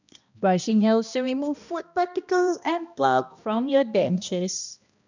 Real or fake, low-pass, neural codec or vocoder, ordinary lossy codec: fake; 7.2 kHz; codec, 16 kHz, 1 kbps, X-Codec, HuBERT features, trained on balanced general audio; none